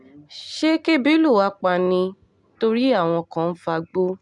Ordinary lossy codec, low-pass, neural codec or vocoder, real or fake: none; 10.8 kHz; none; real